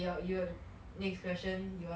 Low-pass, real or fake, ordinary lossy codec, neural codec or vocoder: none; real; none; none